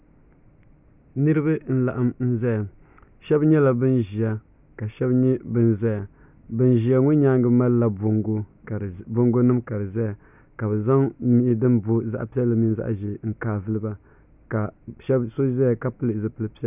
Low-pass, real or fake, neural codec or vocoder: 3.6 kHz; real; none